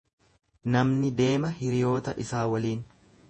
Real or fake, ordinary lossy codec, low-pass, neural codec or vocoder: fake; MP3, 32 kbps; 10.8 kHz; vocoder, 48 kHz, 128 mel bands, Vocos